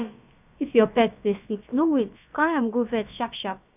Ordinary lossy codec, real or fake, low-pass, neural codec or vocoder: none; fake; 3.6 kHz; codec, 16 kHz, about 1 kbps, DyCAST, with the encoder's durations